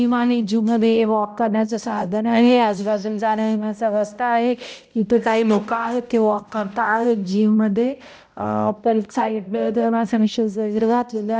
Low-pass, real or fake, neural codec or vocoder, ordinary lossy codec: none; fake; codec, 16 kHz, 0.5 kbps, X-Codec, HuBERT features, trained on balanced general audio; none